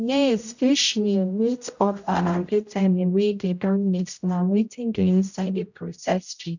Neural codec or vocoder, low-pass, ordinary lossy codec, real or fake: codec, 16 kHz, 0.5 kbps, X-Codec, HuBERT features, trained on general audio; 7.2 kHz; none; fake